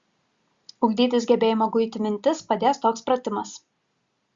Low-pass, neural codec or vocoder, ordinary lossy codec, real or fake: 7.2 kHz; none; Opus, 64 kbps; real